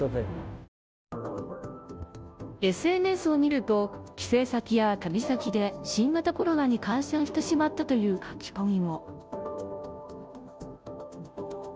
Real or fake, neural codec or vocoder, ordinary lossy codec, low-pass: fake; codec, 16 kHz, 0.5 kbps, FunCodec, trained on Chinese and English, 25 frames a second; none; none